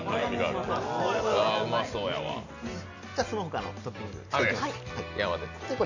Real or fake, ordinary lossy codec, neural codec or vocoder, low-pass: real; none; none; 7.2 kHz